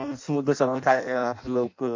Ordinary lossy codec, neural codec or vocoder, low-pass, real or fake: MP3, 48 kbps; codec, 16 kHz in and 24 kHz out, 0.6 kbps, FireRedTTS-2 codec; 7.2 kHz; fake